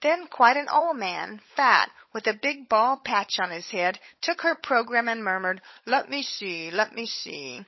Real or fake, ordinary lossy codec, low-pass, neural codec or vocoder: fake; MP3, 24 kbps; 7.2 kHz; codec, 16 kHz, 16 kbps, FunCodec, trained on LibriTTS, 50 frames a second